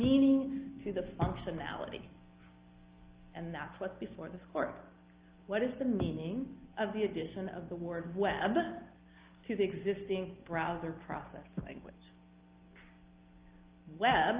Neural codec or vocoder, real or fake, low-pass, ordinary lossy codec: none; real; 3.6 kHz; Opus, 24 kbps